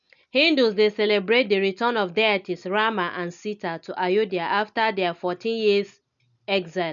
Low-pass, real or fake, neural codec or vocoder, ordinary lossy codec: 7.2 kHz; real; none; none